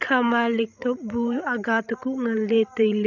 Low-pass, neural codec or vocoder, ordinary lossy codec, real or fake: 7.2 kHz; codec, 16 kHz, 16 kbps, FreqCodec, larger model; none; fake